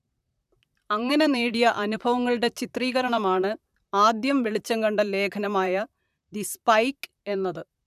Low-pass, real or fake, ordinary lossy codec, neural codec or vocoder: 14.4 kHz; fake; none; vocoder, 44.1 kHz, 128 mel bands, Pupu-Vocoder